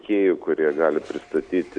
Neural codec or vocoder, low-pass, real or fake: none; 9.9 kHz; real